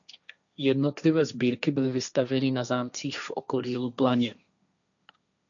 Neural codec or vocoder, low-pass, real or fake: codec, 16 kHz, 1.1 kbps, Voila-Tokenizer; 7.2 kHz; fake